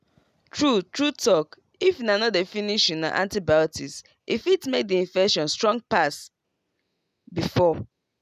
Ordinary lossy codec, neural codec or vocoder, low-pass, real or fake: none; none; 14.4 kHz; real